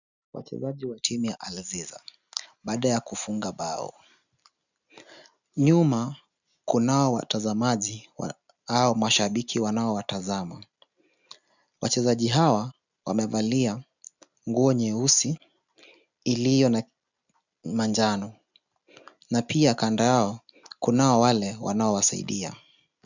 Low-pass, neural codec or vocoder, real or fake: 7.2 kHz; none; real